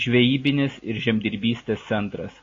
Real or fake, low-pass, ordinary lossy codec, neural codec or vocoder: real; 7.2 kHz; AAC, 32 kbps; none